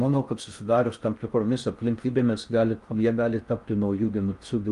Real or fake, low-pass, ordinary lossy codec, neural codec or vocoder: fake; 10.8 kHz; Opus, 32 kbps; codec, 16 kHz in and 24 kHz out, 0.6 kbps, FocalCodec, streaming, 4096 codes